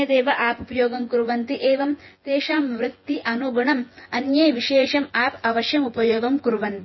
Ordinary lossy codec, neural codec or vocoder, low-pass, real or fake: MP3, 24 kbps; vocoder, 24 kHz, 100 mel bands, Vocos; 7.2 kHz; fake